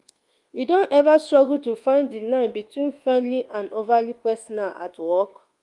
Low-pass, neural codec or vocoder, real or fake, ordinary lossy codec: 10.8 kHz; codec, 24 kHz, 1.2 kbps, DualCodec; fake; Opus, 24 kbps